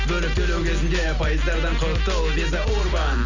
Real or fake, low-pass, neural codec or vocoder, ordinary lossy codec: real; 7.2 kHz; none; none